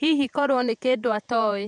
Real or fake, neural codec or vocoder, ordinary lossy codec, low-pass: fake; vocoder, 48 kHz, 128 mel bands, Vocos; none; 10.8 kHz